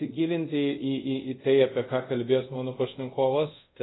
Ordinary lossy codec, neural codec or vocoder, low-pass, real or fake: AAC, 16 kbps; codec, 24 kHz, 0.5 kbps, DualCodec; 7.2 kHz; fake